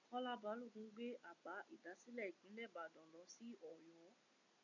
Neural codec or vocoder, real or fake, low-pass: none; real; 7.2 kHz